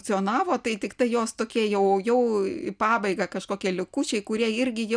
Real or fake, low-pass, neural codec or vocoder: real; 9.9 kHz; none